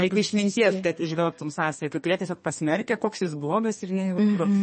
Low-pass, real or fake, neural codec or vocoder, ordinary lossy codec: 10.8 kHz; fake; codec, 32 kHz, 1.9 kbps, SNAC; MP3, 32 kbps